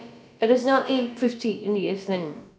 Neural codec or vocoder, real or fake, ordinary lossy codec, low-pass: codec, 16 kHz, about 1 kbps, DyCAST, with the encoder's durations; fake; none; none